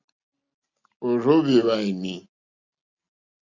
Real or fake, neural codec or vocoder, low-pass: real; none; 7.2 kHz